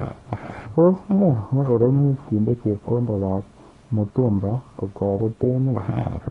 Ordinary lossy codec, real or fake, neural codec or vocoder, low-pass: AAC, 32 kbps; fake; codec, 24 kHz, 0.9 kbps, WavTokenizer, small release; 10.8 kHz